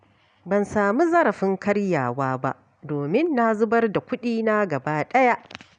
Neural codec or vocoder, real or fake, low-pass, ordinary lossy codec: none; real; 9.9 kHz; none